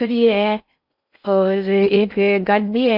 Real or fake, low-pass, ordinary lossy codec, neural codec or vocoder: fake; 5.4 kHz; none; codec, 16 kHz in and 24 kHz out, 0.8 kbps, FocalCodec, streaming, 65536 codes